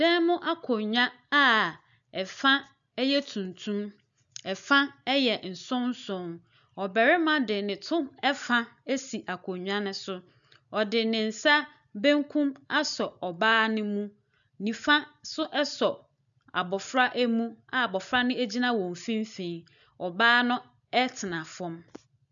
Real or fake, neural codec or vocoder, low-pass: real; none; 7.2 kHz